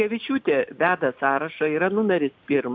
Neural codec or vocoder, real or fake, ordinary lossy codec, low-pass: none; real; MP3, 64 kbps; 7.2 kHz